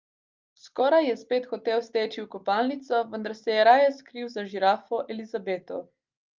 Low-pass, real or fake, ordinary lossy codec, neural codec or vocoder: 7.2 kHz; real; Opus, 24 kbps; none